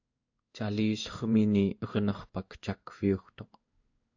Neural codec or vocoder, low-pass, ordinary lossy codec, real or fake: codec, 16 kHz in and 24 kHz out, 1 kbps, XY-Tokenizer; 7.2 kHz; AAC, 48 kbps; fake